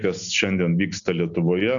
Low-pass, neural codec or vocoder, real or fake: 7.2 kHz; none; real